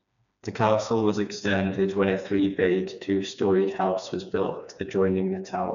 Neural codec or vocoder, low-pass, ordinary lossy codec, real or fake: codec, 16 kHz, 2 kbps, FreqCodec, smaller model; 7.2 kHz; none; fake